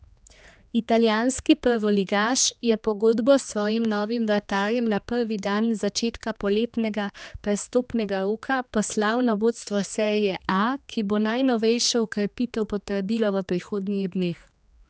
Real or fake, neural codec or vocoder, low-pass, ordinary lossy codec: fake; codec, 16 kHz, 2 kbps, X-Codec, HuBERT features, trained on general audio; none; none